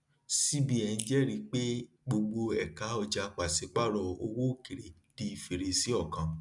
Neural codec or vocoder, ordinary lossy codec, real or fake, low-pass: none; none; real; 10.8 kHz